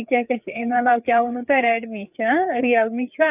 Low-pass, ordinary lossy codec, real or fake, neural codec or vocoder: 3.6 kHz; none; fake; codec, 16 kHz, 4 kbps, FreqCodec, larger model